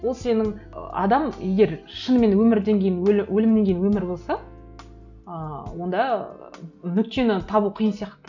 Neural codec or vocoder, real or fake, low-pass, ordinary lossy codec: none; real; 7.2 kHz; none